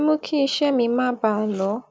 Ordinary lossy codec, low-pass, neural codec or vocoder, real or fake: none; none; none; real